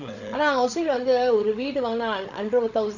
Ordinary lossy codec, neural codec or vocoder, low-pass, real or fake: none; codec, 16 kHz, 16 kbps, FreqCodec, larger model; 7.2 kHz; fake